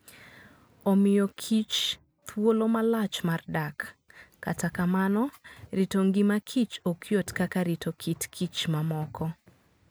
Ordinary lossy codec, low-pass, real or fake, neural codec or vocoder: none; none; real; none